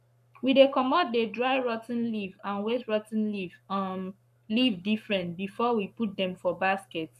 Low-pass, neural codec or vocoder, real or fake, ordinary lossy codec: 14.4 kHz; codec, 44.1 kHz, 7.8 kbps, Pupu-Codec; fake; none